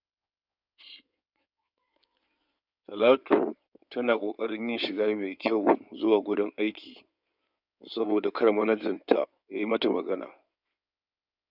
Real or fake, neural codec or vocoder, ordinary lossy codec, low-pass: fake; codec, 16 kHz in and 24 kHz out, 2.2 kbps, FireRedTTS-2 codec; none; 5.4 kHz